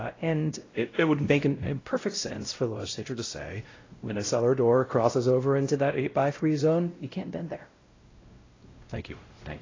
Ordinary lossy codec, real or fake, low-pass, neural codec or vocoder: AAC, 32 kbps; fake; 7.2 kHz; codec, 16 kHz, 0.5 kbps, X-Codec, WavLM features, trained on Multilingual LibriSpeech